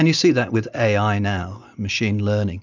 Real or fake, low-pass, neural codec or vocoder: real; 7.2 kHz; none